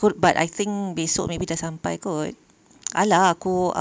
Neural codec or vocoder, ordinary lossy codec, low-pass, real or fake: none; none; none; real